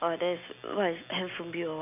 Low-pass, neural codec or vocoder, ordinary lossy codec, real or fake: 3.6 kHz; none; none; real